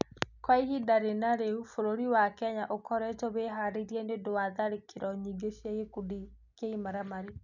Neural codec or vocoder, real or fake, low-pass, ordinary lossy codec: none; real; 7.2 kHz; none